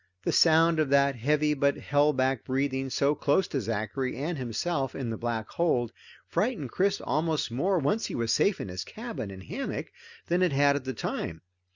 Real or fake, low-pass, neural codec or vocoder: real; 7.2 kHz; none